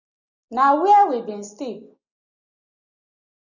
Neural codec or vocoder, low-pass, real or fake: none; 7.2 kHz; real